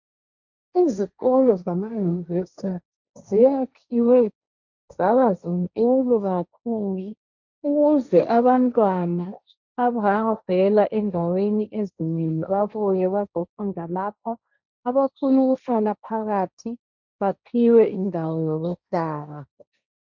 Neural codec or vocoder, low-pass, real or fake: codec, 16 kHz, 1.1 kbps, Voila-Tokenizer; 7.2 kHz; fake